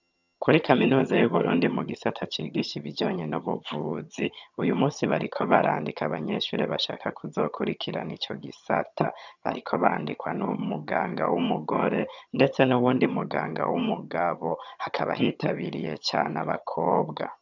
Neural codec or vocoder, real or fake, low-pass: vocoder, 22.05 kHz, 80 mel bands, HiFi-GAN; fake; 7.2 kHz